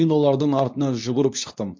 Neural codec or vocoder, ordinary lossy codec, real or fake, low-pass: codec, 24 kHz, 0.9 kbps, WavTokenizer, medium speech release version 2; MP3, 48 kbps; fake; 7.2 kHz